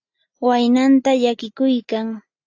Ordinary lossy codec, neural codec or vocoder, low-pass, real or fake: AAC, 48 kbps; none; 7.2 kHz; real